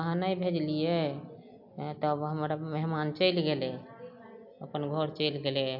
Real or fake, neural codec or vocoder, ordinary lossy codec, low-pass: real; none; AAC, 48 kbps; 5.4 kHz